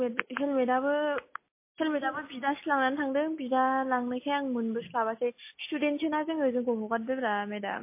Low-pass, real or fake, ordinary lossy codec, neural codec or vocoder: 3.6 kHz; real; MP3, 24 kbps; none